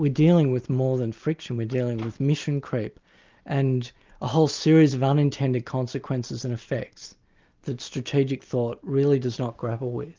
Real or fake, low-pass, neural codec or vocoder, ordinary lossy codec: real; 7.2 kHz; none; Opus, 32 kbps